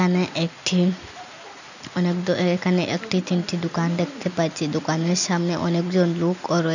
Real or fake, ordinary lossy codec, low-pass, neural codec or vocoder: real; none; 7.2 kHz; none